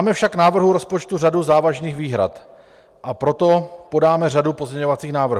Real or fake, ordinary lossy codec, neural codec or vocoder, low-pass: real; Opus, 32 kbps; none; 14.4 kHz